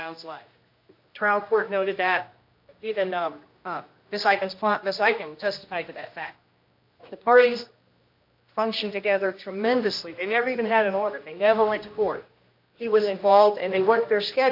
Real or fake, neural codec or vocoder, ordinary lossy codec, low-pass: fake; codec, 16 kHz, 1 kbps, X-Codec, HuBERT features, trained on general audio; MP3, 48 kbps; 5.4 kHz